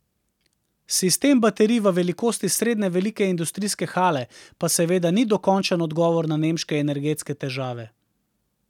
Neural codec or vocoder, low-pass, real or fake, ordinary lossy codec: none; 19.8 kHz; real; none